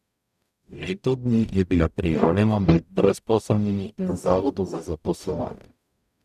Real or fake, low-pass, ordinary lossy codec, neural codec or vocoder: fake; 14.4 kHz; none; codec, 44.1 kHz, 0.9 kbps, DAC